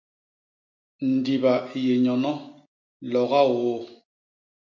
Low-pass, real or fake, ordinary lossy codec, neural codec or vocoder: 7.2 kHz; real; MP3, 48 kbps; none